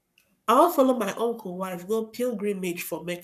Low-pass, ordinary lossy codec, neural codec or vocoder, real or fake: 14.4 kHz; none; codec, 44.1 kHz, 7.8 kbps, Pupu-Codec; fake